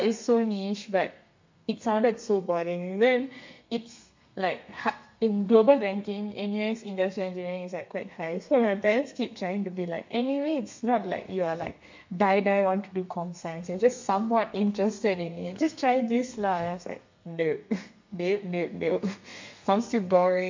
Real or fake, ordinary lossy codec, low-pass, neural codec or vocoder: fake; MP3, 48 kbps; 7.2 kHz; codec, 32 kHz, 1.9 kbps, SNAC